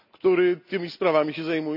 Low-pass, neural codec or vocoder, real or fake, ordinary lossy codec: 5.4 kHz; none; real; none